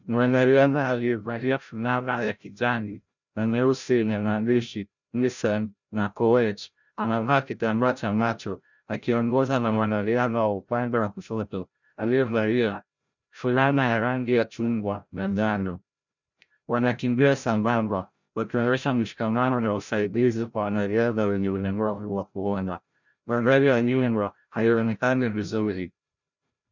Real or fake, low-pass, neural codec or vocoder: fake; 7.2 kHz; codec, 16 kHz, 0.5 kbps, FreqCodec, larger model